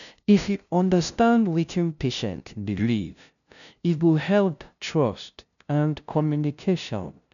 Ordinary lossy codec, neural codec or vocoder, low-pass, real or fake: none; codec, 16 kHz, 0.5 kbps, FunCodec, trained on LibriTTS, 25 frames a second; 7.2 kHz; fake